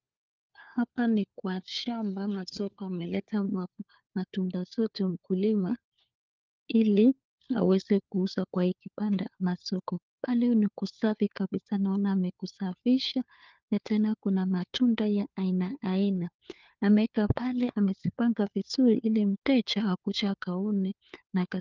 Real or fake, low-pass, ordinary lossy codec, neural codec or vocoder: fake; 7.2 kHz; Opus, 32 kbps; codec, 16 kHz, 4 kbps, FunCodec, trained on LibriTTS, 50 frames a second